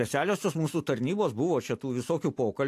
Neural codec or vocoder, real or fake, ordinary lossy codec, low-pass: vocoder, 44.1 kHz, 128 mel bands every 256 samples, BigVGAN v2; fake; AAC, 64 kbps; 14.4 kHz